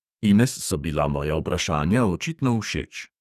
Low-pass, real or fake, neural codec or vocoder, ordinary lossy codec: 14.4 kHz; fake; codec, 32 kHz, 1.9 kbps, SNAC; none